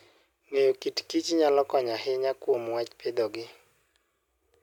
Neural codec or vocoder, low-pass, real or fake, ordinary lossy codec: none; 19.8 kHz; real; none